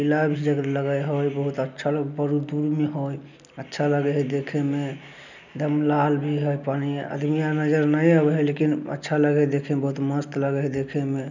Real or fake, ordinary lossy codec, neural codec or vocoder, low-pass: real; none; none; 7.2 kHz